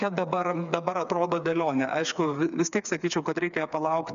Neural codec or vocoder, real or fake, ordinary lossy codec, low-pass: codec, 16 kHz, 4 kbps, FreqCodec, smaller model; fake; MP3, 96 kbps; 7.2 kHz